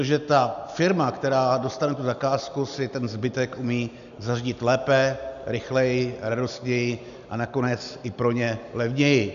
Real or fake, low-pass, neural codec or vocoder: real; 7.2 kHz; none